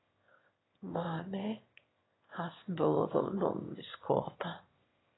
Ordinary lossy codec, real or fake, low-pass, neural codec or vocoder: AAC, 16 kbps; fake; 7.2 kHz; autoencoder, 22.05 kHz, a latent of 192 numbers a frame, VITS, trained on one speaker